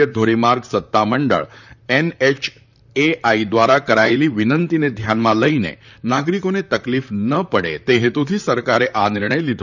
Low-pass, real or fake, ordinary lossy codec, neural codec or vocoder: 7.2 kHz; fake; none; vocoder, 44.1 kHz, 128 mel bands, Pupu-Vocoder